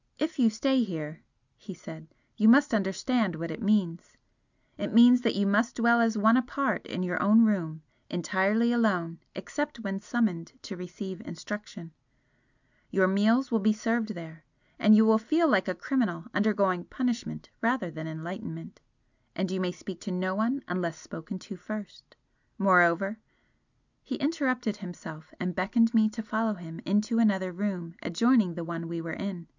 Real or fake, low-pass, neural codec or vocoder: real; 7.2 kHz; none